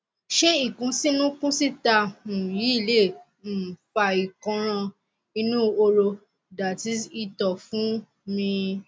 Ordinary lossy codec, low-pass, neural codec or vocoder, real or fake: none; none; none; real